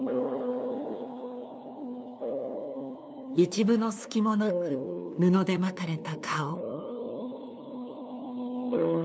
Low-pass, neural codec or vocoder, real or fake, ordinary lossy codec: none; codec, 16 kHz, 2 kbps, FunCodec, trained on LibriTTS, 25 frames a second; fake; none